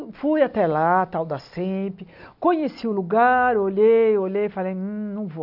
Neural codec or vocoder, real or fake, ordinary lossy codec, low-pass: none; real; none; 5.4 kHz